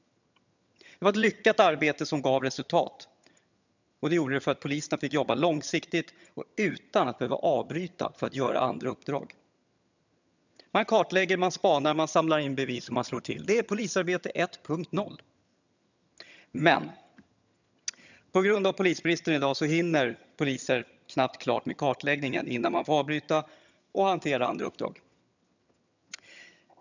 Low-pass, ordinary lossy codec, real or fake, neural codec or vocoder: 7.2 kHz; none; fake; vocoder, 22.05 kHz, 80 mel bands, HiFi-GAN